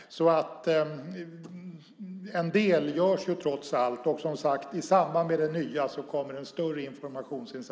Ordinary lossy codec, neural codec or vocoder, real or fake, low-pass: none; none; real; none